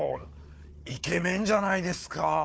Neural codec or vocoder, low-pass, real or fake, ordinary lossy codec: codec, 16 kHz, 4 kbps, FunCodec, trained on Chinese and English, 50 frames a second; none; fake; none